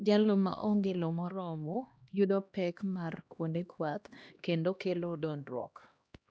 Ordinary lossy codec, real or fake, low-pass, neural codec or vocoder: none; fake; none; codec, 16 kHz, 1 kbps, X-Codec, HuBERT features, trained on LibriSpeech